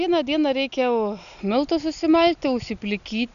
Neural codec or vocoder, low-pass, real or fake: none; 7.2 kHz; real